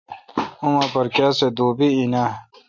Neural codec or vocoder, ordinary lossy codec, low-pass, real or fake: none; MP3, 64 kbps; 7.2 kHz; real